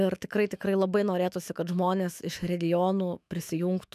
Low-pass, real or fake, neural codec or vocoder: 14.4 kHz; fake; autoencoder, 48 kHz, 128 numbers a frame, DAC-VAE, trained on Japanese speech